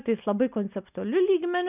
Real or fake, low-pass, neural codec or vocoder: real; 3.6 kHz; none